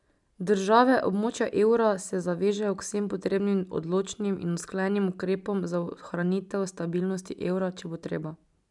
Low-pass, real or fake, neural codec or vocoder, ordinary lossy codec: 10.8 kHz; real; none; none